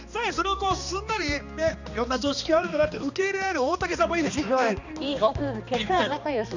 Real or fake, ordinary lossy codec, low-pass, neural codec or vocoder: fake; none; 7.2 kHz; codec, 16 kHz, 2 kbps, X-Codec, HuBERT features, trained on balanced general audio